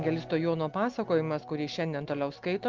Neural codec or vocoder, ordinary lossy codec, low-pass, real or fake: none; Opus, 32 kbps; 7.2 kHz; real